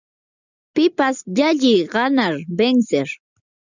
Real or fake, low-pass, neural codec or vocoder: real; 7.2 kHz; none